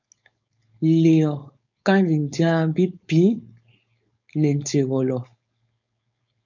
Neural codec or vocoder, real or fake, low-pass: codec, 16 kHz, 4.8 kbps, FACodec; fake; 7.2 kHz